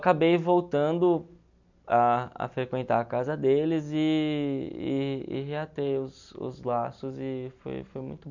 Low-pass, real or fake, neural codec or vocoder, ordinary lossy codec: 7.2 kHz; real; none; none